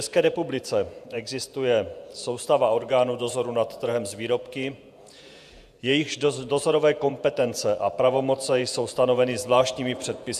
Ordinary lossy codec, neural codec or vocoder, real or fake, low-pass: AAC, 96 kbps; vocoder, 48 kHz, 128 mel bands, Vocos; fake; 14.4 kHz